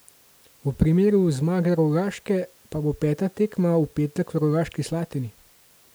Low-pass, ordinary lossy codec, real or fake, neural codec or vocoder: none; none; real; none